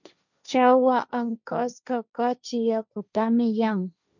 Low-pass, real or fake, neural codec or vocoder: 7.2 kHz; fake; codec, 16 kHz, 1.1 kbps, Voila-Tokenizer